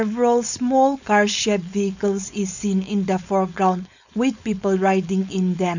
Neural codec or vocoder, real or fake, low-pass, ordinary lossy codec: codec, 16 kHz, 4.8 kbps, FACodec; fake; 7.2 kHz; none